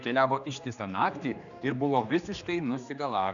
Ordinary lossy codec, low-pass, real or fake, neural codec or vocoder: MP3, 96 kbps; 7.2 kHz; fake; codec, 16 kHz, 2 kbps, X-Codec, HuBERT features, trained on general audio